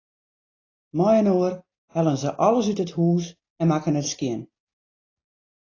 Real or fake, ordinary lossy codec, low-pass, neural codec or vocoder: real; AAC, 32 kbps; 7.2 kHz; none